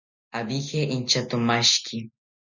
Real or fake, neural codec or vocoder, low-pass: real; none; 7.2 kHz